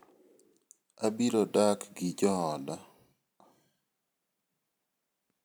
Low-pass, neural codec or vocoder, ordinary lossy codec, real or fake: none; vocoder, 44.1 kHz, 128 mel bands every 512 samples, BigVGAN v2; none; fake